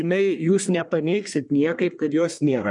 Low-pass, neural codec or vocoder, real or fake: 10.8 kHz; codec, 24 kHz, 1 kbps, SNAC; fake